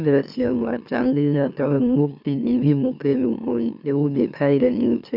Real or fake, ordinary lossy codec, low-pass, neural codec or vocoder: fake; none; 5.4 kHz; autoencoder, 44.1 kHz, a latent of 192 numbers a frame, MeloTTS